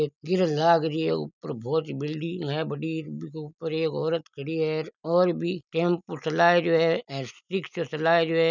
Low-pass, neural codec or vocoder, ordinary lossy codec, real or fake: 7.2 kHz; none; none; real